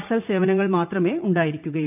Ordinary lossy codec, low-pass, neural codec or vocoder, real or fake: none; 3.6 kHz; vocoder, 44.1 kHz, 80 mel bands, Vocos; fake